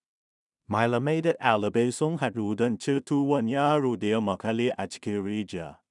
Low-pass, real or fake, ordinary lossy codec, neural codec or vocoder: 10.8 kHz; fake; none; codec, 16 kHz in and 24 kHz out, 0.4 kbps, LongCat-Audio-Codec, two codebook decoder